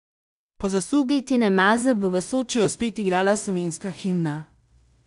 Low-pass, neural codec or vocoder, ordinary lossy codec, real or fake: 10.8 kHz; codec, 16 kHz in and 24 kHz out, 0.4 kbps, LongCat-Audio-Codec, two codebook decoder; none; fake